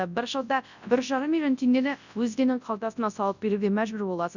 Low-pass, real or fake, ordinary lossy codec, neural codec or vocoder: 7.2 kHz; fake; none; codec, 24 kHz, 0.9 kbps, WavTokenizer, large speech release